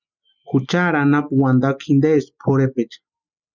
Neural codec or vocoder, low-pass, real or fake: none; 7.2 kHz; real